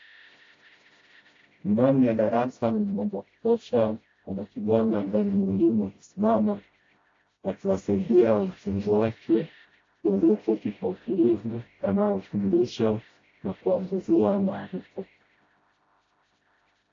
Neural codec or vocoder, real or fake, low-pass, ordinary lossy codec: codec, 16 kHz, 0.5 kbps, FreqCodec, smaller model; fake; 7.2 kHz; AAC, 32 kbps